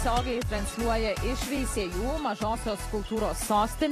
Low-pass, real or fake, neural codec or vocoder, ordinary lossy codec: 14.4 kHz; fake; vocoder, 44.1 kHz, 128 mel bands every 256 samples, BigVGAN v2; AAC, 48 kbps